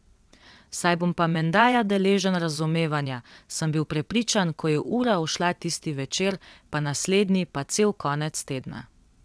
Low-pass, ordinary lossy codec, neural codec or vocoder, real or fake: none; none; vocoder, 22.05 kHz, 80 mel bands, WaveNeXt; fake